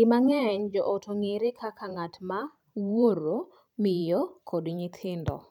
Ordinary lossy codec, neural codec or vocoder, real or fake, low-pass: none; vocoder, 44.1 kHz, 128 mel bands every 512 samples, BigVGAN v2; fake; 19.8 kHz